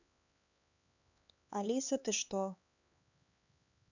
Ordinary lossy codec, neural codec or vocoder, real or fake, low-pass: none; codec, 16 kHz, 4 kbps, X-Codec, HuBERT features, trained on LibriSpeech; fake; 7.2 kHz